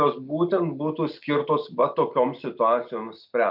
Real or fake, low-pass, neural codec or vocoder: real; 5.4 kHz; none